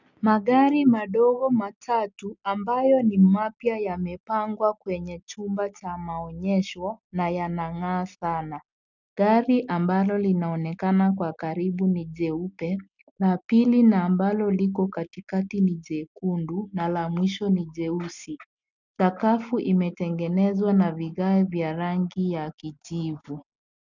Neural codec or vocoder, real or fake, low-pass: none; real; 7.2 kHz